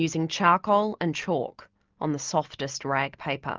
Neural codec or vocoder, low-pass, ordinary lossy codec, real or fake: none; 7.2 kHz; Opus, 24 kbps; real